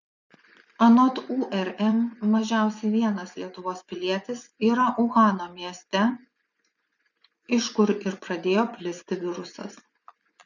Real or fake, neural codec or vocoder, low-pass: fake; vocoder, 24 kHz, 100 mel bands, Vocos; 7.2 kHz